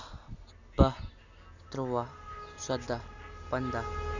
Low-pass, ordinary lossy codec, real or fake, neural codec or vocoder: 7.2 kHz; none; real; none